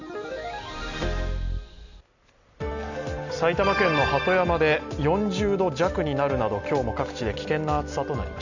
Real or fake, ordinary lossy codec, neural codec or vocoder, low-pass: real; none; none; 7.2 kHz